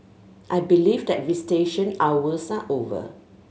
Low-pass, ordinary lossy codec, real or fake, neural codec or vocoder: none; none; real; none